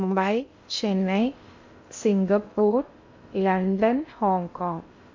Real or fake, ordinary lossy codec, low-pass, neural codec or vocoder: fake; MP3, 48 kbps; 7.2 kHz; codec, 16 kHz in and 24 kHz out, 0.6 kbps, FocalCodec, streaming, 2048 codes